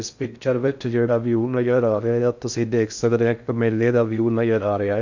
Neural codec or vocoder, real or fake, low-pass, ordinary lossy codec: codec, 16 kHz in and 24 kHz out, 0.6 kbps, FocalCodec, streaming, 2048 codes; fake; 7.2 kHz; none